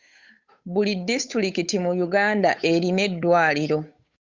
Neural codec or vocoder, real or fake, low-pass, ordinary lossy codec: codec, 16 kHz, 8 kbps, FunCodec, trained on Chinese and English, 25 frames a second; fake; 7.2 kHz; Opus, 64 kbps